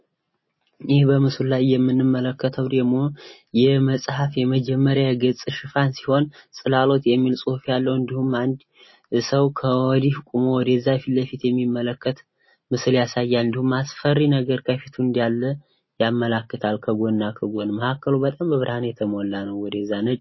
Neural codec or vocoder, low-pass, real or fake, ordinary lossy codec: none; 7.2 kHz; real; MP3, 24 kbps